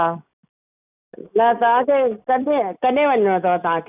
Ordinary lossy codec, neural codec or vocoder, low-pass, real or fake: none; none; 3.6 kHz; real